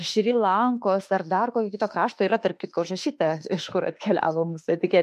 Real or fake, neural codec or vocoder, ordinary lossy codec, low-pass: fake; autoencoder, 48 kHz, 32 numbers a frame, DAC-VAE, trained on Japanese speech; MP3, 64 kbps; 14.4 kHz